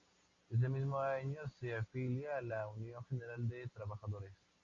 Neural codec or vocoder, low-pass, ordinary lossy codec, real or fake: none; 7.2 kHz; Opus, 64 kbps; real